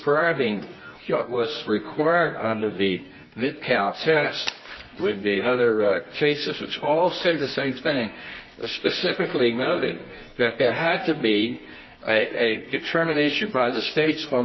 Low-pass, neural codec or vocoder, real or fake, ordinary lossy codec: 7.2 kHz; codec, 24 kHz, 0.9 kbps, WavTokenizer, medium music audio release; fake; MP3, 24 kbps